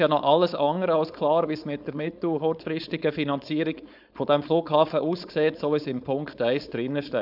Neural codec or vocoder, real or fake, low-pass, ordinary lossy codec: codec, 16 kHz, 4.8 kbps, FACodec; fake; 5.4 kHz; none